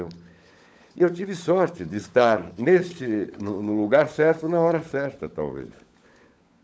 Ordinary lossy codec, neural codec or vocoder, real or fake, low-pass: none; codec, 16 kHz, 16 kbps, FunCodec, trained on LibriTTS, 50 frames a second; fake; none